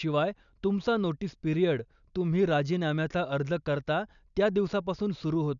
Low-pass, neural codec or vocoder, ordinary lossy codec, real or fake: 7.2 kHz; none; none; real